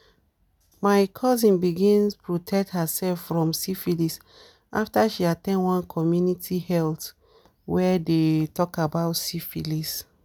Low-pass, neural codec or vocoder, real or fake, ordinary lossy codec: none; none; real; none